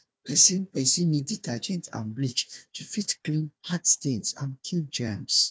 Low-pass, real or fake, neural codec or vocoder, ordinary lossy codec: none; fake; codec, 16 kHz, 1 kbps, FunCodec, trained on Chinese and English, 50 frames a second; none